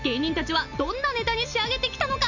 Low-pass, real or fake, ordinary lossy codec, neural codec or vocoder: 7.2 kHz; real; none; none